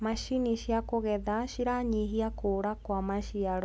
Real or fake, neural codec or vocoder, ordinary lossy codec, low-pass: real; none; none; none